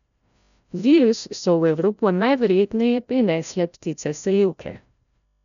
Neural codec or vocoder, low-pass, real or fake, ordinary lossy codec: codec, 16 kHz, 0.5 kbps, FreqCodec, larger model; 7.2 kHz; fake; none